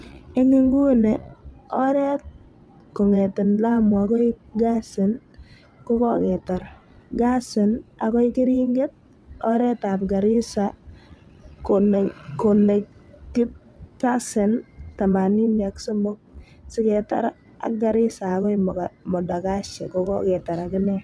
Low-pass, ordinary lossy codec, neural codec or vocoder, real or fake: none; none; vocoder, 22.05 kHz, 80 mel bands, WaveNeXt; fake